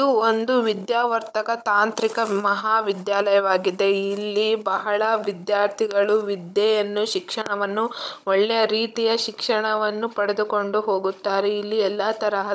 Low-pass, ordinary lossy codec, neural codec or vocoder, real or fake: none; none; codec, 16 kHz, 16 kbps, FunCodec, trained on Chinese and English, 50 frames a second; fake